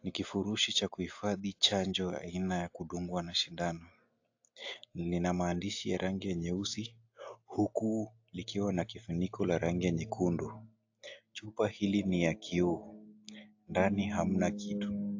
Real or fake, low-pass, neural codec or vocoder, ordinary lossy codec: real; 7.2 kHz; none; AAC, 48 kbps